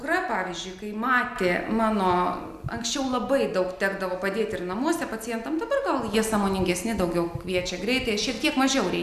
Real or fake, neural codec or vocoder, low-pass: real; none; 14.4 kHz